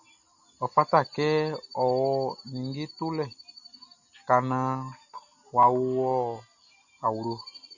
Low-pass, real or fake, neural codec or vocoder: 7.2 kHz; real; none